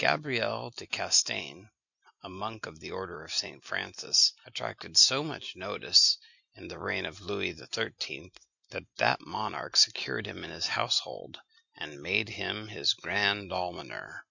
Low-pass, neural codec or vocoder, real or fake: 7.2 kHz; none; real